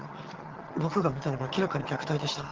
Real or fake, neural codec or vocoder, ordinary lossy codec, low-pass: fake; vocoder, 22.05 kHz, 80 mel bands, HiFi-GAN; Opus, 16 kbps; 7.2 kHz